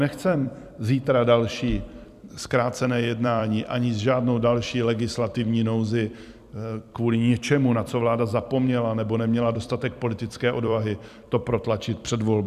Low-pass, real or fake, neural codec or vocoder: 14.4 kHz; fake; vocoder, 44.1 kHz, 128 mel bands every 256 samples, BigVGAN v2